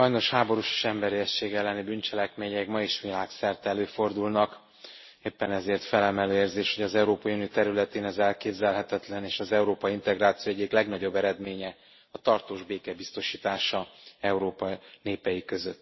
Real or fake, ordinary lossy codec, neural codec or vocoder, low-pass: real; MP3, 24 kbps; none; 7.2 kHz